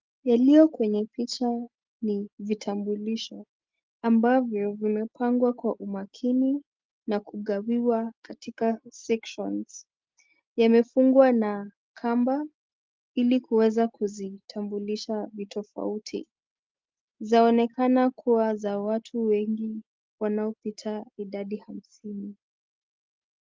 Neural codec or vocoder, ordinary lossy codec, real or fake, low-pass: none; Opus, 32 kbps; real; 7.2 kHz